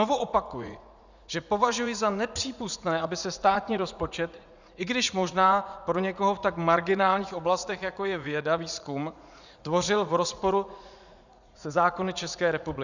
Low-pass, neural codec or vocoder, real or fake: 7.2 kHz; vocoder, 44.1 kHz, 80 mel bands, Vocos; fake